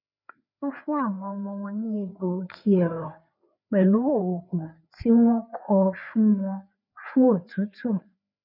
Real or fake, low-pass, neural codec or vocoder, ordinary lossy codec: fake; 5.4 kHz; codec, 16 kHz, 4 kbps, FreqCodec, larger model; none